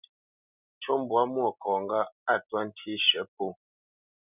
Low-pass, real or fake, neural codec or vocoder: 3.6 kHz; real; none